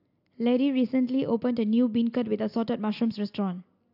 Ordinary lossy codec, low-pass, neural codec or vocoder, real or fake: AAC, 48 kbps; 5.4 kHz; none; real